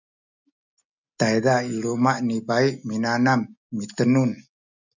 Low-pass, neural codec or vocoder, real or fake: 7.2 kHz; none; real